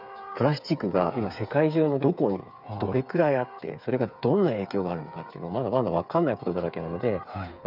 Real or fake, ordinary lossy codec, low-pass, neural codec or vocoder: fake; none; 5.4 kHz; codec, 16 kHz, 8 kbps, FreqCodec, smaller model